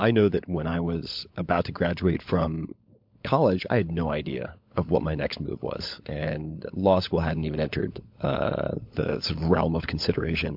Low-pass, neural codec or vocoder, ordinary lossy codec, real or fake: 5.4 kHz; codec, 16 kHz, 16 kbps, FunCodec, trained on LibriTTS, 50 frames a second; MP3, 48 kbps; fake